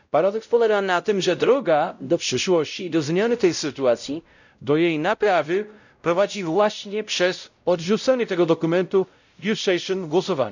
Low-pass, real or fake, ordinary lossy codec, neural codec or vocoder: 7.2 kHz; fake; none; codec, 16 kHz, 0.5 kbps, X-Codec, WavLM features, trained on Multilingual LibriSpeech